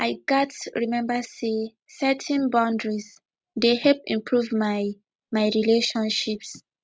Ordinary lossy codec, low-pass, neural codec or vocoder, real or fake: none; none; none; real